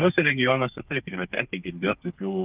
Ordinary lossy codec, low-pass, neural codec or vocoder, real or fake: Opus, 32 kbps; 3.6 kHz; codec, 32 kHz, 1.9 kbps, SNAC; fake